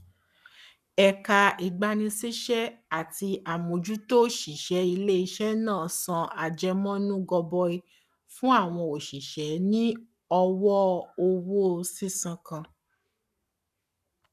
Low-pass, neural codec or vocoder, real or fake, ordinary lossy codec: 14.4 kHz; codec, 44.1 kHz, 7.8 kbps, Pupu-Codec; fake; none